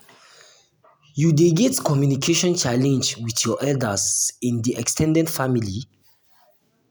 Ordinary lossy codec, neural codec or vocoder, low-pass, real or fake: none; none; none; real